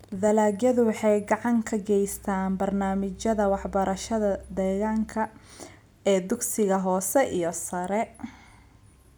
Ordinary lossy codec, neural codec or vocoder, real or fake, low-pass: none; none; real; none